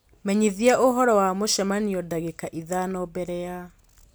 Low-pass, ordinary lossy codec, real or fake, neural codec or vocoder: none; none; real; none